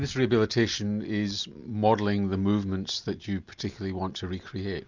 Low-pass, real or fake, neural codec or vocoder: 7.2 kHz; real; none